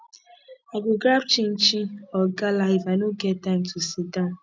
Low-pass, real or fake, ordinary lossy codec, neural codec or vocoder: none; real; none; none